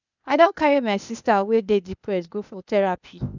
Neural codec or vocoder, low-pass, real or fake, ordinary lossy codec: codec, 16 kHz, 0.8 kbps, ZipCodec; 7.2 kHz; fake; none